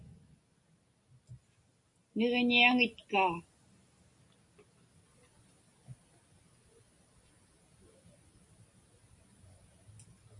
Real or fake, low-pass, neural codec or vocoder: real; 10.8 kHz; none